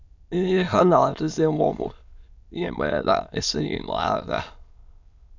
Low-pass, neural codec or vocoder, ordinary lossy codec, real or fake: 7.2 kHz; autoencoder, 22.05 kHz, a latent of 192 numbers a frame, VITS, trained on many speakers; none; fake